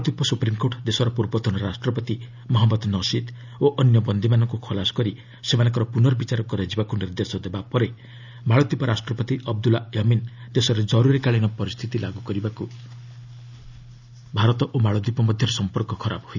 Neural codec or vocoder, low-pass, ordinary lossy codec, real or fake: none; 7.2 kHz; none; real